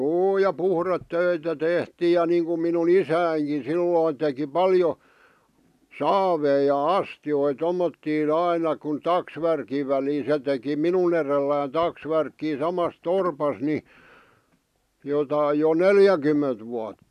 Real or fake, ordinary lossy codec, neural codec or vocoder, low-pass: real; none; none; 14.4 kHz